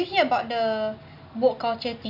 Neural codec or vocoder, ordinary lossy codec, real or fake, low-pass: none; none; real; 5.4 kHz